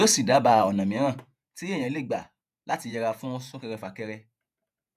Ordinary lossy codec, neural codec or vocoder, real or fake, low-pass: none; vocoder, 48 kHz, 128 mel bands, Vocos; fake; 14.4 kHz